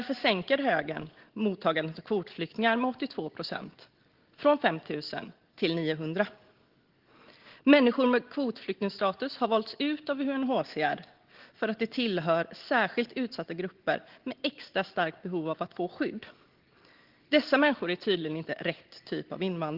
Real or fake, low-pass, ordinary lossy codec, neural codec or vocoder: real; 5.4 kHz; Opus, 16 kbps; none